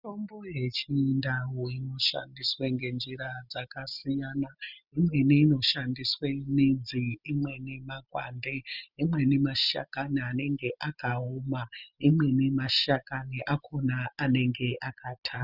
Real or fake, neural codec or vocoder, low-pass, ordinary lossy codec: real; none; 5.4 kHz; Opus, 64 kbps